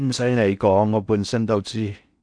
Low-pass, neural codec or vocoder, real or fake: 9.9 kHz; codec, 16 kHz in and 24 kHz out, 0.6 kbps, FocalCodec, streaming, 4096 codes; fake